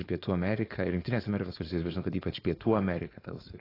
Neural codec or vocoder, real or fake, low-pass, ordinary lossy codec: codec, 16 kHz, 4.8 kbps, FACodec; fake; 5.4 kHz; AAC, 24 kbps